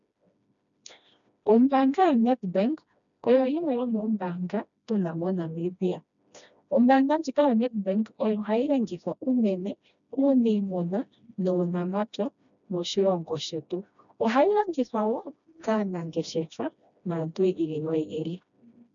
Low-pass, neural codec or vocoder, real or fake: 7.2 kHz; codec, 16 kHz, 1 kbps, FreqCodec, smaller model; fake